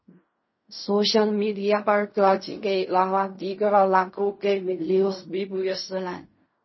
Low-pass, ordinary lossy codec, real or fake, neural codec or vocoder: 7.2 kHz; MP3, 24 kbps; fake; codec, 16 kHz in and 24 kHz out, 0.4 kbps, LongCat-Audio-Codec, fine tuned four codebook decoder